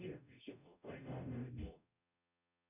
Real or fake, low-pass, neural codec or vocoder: fake; 3.6 kHz; codec, 44.1 kHz, 0.9 kbps, DAC